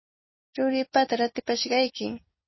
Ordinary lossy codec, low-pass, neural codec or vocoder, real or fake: MP3, 24 kbps; 7.2 kHz; none; real